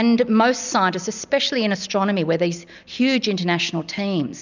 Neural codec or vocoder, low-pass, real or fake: none; 7.2 kHz; real